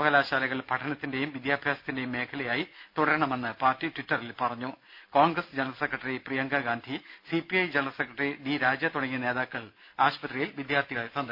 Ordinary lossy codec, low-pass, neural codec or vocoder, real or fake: MP3, 48 kbps; 5.4 kHz; none; real